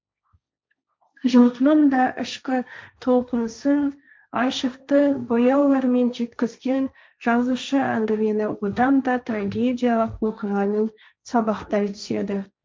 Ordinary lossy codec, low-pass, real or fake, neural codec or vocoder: none; none; fake; codec, 16 kHz, 1.1 kbps, Voila-Tokenizer